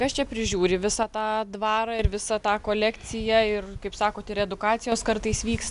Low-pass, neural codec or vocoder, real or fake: 10.8 kHz; none; real